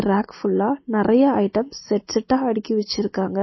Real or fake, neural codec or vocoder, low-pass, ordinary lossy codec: real; none; 7.2 kHz; MP3, 24 kbps